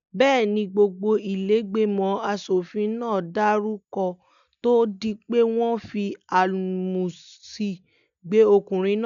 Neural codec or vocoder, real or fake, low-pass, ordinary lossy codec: none; real; 7.2 kHz; none